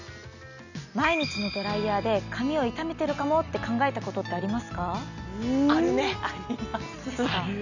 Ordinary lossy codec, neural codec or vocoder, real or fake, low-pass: none; none; real; 7.2 kHz